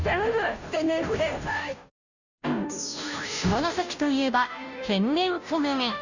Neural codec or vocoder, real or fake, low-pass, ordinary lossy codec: codec, 16 kHz, 0.5 kbps, FunCodec, trained on Chinese and English, 25 frames a second; fake; 7.2 kHz; none